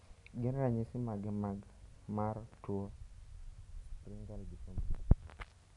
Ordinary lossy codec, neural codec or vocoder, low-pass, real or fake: none; none; 10.8 kHz; real